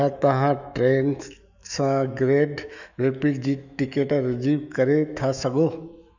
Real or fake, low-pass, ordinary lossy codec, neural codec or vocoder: fake; 7.2 kHz; none; autoencoder, 48 kHz, 128 numbers a frame, DAC-VAE, trained on Japanese speech